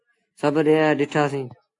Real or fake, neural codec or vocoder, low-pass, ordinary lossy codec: real; none; 10.8 kHz; AAC, 48 kbps